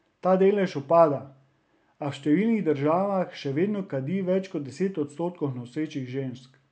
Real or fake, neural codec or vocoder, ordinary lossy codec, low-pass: real; none; none; none